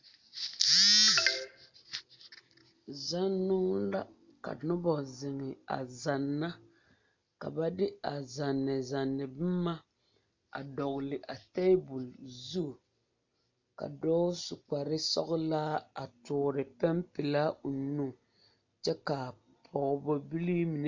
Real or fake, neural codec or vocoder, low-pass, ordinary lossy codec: real; none; 7.2 kHz; MP3, 64 kbps